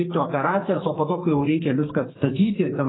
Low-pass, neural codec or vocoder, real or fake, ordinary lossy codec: 7.2 kHz; codec, 44.1 kHz, 2.6 kbps, SNAC; fake; AAC, 16 kbps